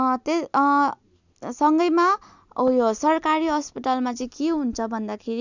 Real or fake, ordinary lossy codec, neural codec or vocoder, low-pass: real; none; none; 7.2 kHz